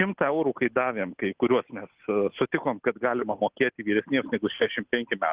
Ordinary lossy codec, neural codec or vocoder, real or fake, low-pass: Opus, 16 kbps; none; real; 3.6 kHz